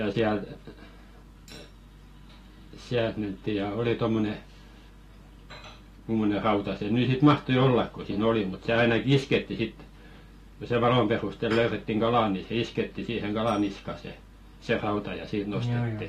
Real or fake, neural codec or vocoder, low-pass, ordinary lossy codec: real; none; 14.4 kHz; AAC, 48 kbps